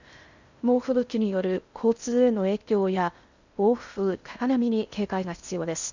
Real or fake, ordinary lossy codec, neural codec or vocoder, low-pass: fake; Opus, 64 kbps; codec, 16 kHz in and 24 kHz out, 0.6 kbps, FocalCodec, streaming, 2048 codes; 7.2 kHz